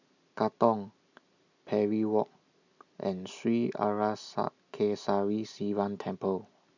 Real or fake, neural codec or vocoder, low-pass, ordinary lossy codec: real; none; 7.2 kHz; none